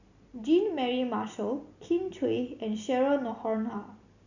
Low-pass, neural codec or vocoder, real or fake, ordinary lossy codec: 7.2 kHz; none; real; none